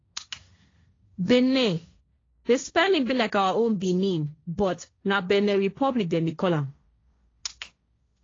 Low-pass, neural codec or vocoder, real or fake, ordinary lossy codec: 7.2 kHz; codec, 16 kHz, 1.1 kbps, Voila-Tokenizer; fake; AAC, 32 kbps